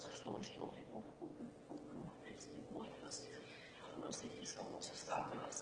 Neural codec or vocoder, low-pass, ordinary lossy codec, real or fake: autoencoder, 22.05 kHz, a latent of 192 numbers a frame, VITS, trained on one speaker; 9.9 kHz; Opus, 16 kbps; fake